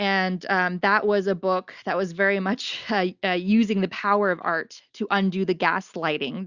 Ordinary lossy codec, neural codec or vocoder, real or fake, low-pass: Opus, 64 kbps; none; real; 7.2 kHz